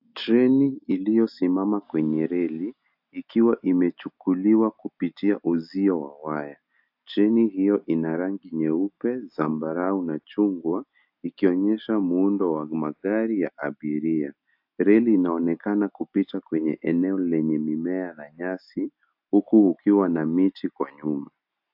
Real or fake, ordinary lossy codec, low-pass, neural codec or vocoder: real; AAC, 48 kbps; 5.4 kHz; none